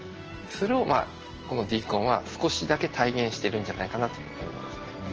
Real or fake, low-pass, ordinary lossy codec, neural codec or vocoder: real; 7.2 kHz; Opus, 16 kbps; none